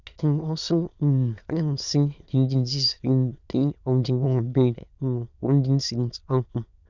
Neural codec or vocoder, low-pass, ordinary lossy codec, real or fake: autoencoder, 22.05 kHz, a latent of 192 numbers a frame, VITS, trained on many speakers; 7.2 kHz; none; fake